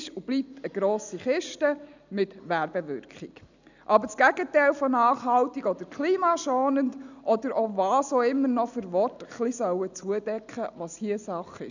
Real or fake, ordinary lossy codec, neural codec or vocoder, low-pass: real; none; none; 7.2 kHz